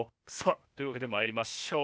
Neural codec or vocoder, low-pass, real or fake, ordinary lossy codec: codec, 16 kHz, 0.8 kbps, ZipCodec; none; fake; none